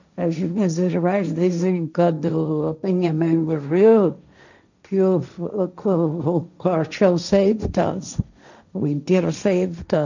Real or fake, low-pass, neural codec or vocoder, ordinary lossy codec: fake; 7.2 kHz; codec, 16 kHz, 1.1 kbps, Voila-Tokenizer; none